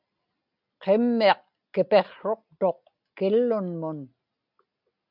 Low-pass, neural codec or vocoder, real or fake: 5.4 kHz; none; real